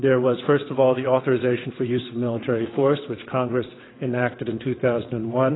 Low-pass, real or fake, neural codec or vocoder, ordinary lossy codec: 7.2 kHz; fake; vocoder, 22.05 kHz, 80 mel bands, WaveNeXt; AAC, 16 kbps